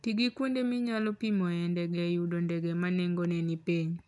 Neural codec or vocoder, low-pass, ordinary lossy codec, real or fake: none; 10.8 kHz; none; real